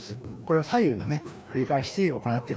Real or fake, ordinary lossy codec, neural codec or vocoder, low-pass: fake; none; codec, 16 kHz, 1 kbps, FreqCodec, larger model; none